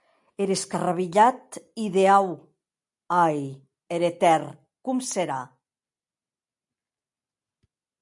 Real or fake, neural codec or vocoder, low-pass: real; none; 10.8 kHz